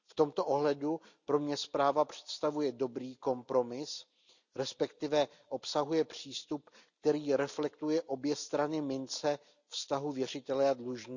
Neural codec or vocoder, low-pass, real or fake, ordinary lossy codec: none; 7.2 kHz; real; none